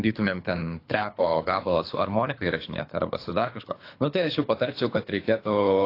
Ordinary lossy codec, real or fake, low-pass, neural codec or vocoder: AAC, 32 kbps; fake; 5.4 kHz; codec, 24 kHz, 3 kbps, HILCodec